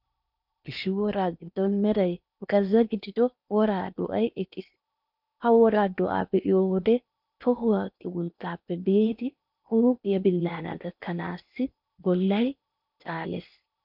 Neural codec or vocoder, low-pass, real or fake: codec, 16 kHz in and 24 kHz out, 0.8 kbps, FocalCodec, streaming, 65536 codes; 5.4 kHz; fake